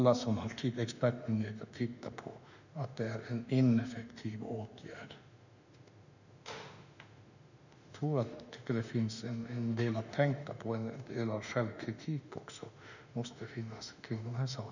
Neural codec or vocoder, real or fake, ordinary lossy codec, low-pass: autoencoder, 48 kHz, 32 numbers a frame, DAC-VAE, trained on Japanese speech; fake; none; 7.2 kHz